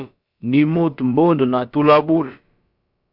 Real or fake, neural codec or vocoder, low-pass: fake; codec, 16 kHz, about 1 kbps, DyCAST, with the encoder's durations; 5.4 kHz